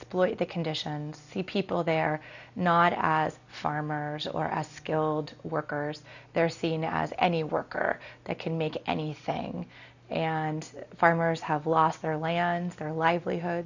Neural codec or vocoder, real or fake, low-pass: none; real; 7.2 kHz